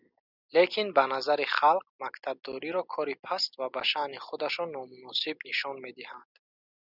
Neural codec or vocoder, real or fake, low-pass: none; real; 5.4 kHz